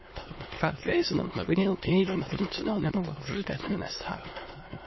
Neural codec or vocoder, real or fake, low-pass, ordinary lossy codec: autoencoder, 22.05 kHz, a latent of 192 numbers a frame, VITS, trained on many speakers; fake; 7.2 kHz; MP3, 24 kbps